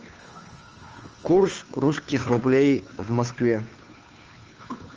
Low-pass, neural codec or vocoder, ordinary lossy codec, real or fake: 7.2 kHz; codec, 16 kHz, 2 kbps, FunCodec, trained on Chinese and English, 25 frames a second; Opus, 24 kbps; fake